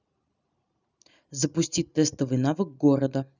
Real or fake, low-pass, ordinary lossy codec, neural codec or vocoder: real; 7.2 kHz; none; none